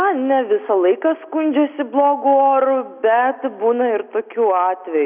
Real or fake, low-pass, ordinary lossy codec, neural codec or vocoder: real; 3.6 kHz; Opus, 64 kbps; none